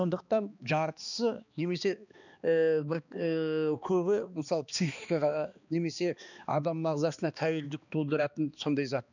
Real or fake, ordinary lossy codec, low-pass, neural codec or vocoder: fake; none; 7.2 kHz; codec, 16 kHz, 2 kbps, X-Codec, HuBERT features, trained on balanced general audio